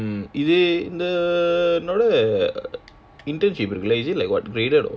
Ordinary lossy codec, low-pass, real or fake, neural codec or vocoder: none; none; real; none